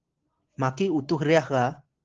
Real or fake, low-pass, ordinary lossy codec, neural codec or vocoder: real; 7.2 kHz; Opus, 16 kbps; none